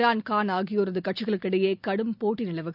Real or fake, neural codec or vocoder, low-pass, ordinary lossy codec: real; none; 5.4 kHz; none